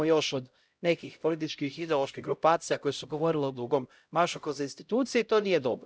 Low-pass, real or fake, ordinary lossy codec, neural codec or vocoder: none; fake; none; codec, 16 kHz, 0.5 kbps, X-Codec, HuBERT features, trained on LibriSpeech